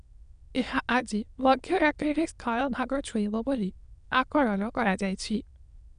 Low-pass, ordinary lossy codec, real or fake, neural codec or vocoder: 9.9 kHz; none; fake; autoencoder, 22.05 kHz, a latent of 192 numbers a frame, VITS, trained on many speakers